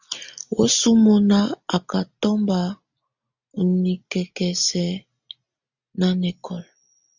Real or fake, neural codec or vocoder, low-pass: real; none; 7.2 kHz